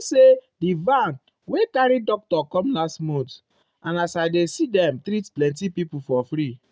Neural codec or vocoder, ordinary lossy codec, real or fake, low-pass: none; none; real; none